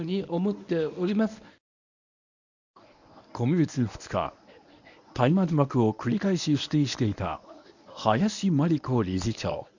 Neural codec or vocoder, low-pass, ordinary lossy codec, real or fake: codec, 24 kHz, 0.9 kbps, WavTokenizer, medium speech release version 1; 7.2 kHz; none; fake